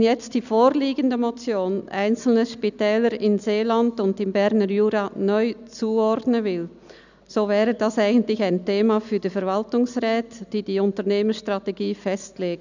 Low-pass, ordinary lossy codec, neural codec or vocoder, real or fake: 7.2 kHz; none; none; real